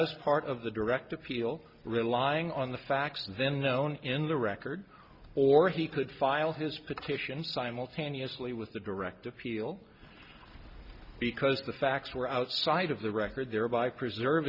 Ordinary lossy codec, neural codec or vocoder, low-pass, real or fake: Opus, 64 kbps; none; 5.4 kHz; real